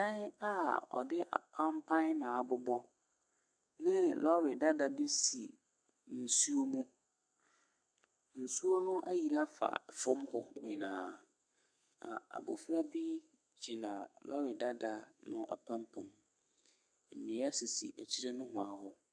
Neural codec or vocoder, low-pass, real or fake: codec, 32 kHz, 1.9 kbps, SNAC; 9.9 kHz; fake